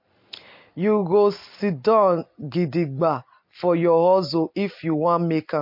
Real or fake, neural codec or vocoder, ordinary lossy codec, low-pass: real; none; MP3, 32 kbps; 5.4 kHz